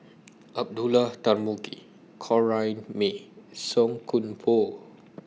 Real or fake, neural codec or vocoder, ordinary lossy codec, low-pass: real; none; none; none